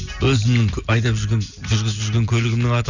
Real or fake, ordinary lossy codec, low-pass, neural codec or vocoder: real; none; 7.2 kHz; none